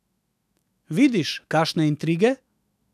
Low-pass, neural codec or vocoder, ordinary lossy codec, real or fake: 14.4 kHz; autoencoder, 48 kHz, 128 numbers a frame, DAC-VAE, trained on Japanese speech; none; fake